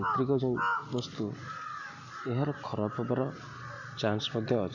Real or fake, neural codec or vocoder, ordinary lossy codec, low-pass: real; none; none; 7.2 kHz